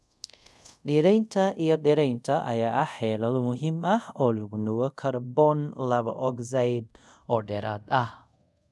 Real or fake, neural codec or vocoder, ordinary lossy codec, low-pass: fake; codec, 24 kHz, 0.5 kbps, DualCodec; none; none